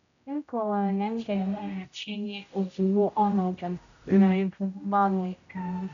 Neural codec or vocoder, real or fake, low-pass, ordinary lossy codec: codec, 16 kHz, 0.5 kbps, X-Codec, HuBERT features, trained on general audio; fake; 7.2 kHz; none